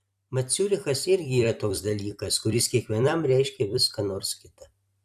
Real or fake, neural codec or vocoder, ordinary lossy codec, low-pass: fake; vocoder, 44.1 kHz, 128 mel bands every 256 samples, BigVGAN v2; AAC, 96 kbps; 14.4 kHz